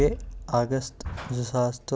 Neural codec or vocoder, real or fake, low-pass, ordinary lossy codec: none; real; none; none